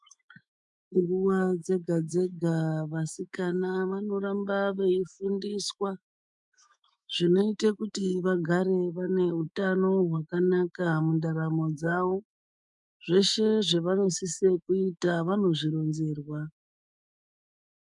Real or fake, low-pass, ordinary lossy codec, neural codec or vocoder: fake; 10.8 kHz; MP3, 96 kbps; autoencoder, 48 kHz, 128 numbers a frame, DAC-VAE, trained on Japanese speech